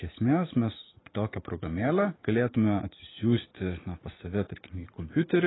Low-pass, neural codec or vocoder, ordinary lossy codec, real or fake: 7.2 kHz; vocoder, 44.1 kHz, 80 mel bands, Vocos; AAC, 16 kbps; fake